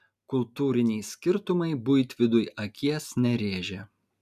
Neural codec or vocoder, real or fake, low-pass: none; real; 14.4 kHz